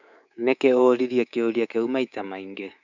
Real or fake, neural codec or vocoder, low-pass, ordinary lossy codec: fake; codec, 24 kHz, 3.1 kbps, DualCodec; 7.2 kHz; none